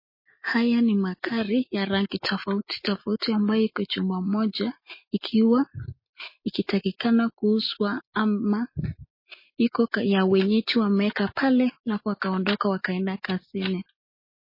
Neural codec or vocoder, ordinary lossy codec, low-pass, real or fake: none; MP3, 24 kbps; 5.4 kHz; real